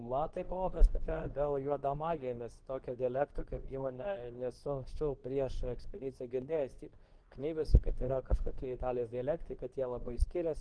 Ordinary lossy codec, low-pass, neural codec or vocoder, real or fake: Opus, 16 kbps; 9.9 kHz; codec, 24 kHz, 0.9 kbps, WavTokenizer, medium speech release version 2; fake